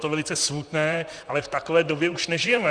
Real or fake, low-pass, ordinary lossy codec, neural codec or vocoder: fake; 9.9 kHz; Opus, 64 kbps; vocoder, 44.1 kHz, 128 mel bands, Pupu-Vocoder